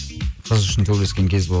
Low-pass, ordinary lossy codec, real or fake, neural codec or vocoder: none; none; real; none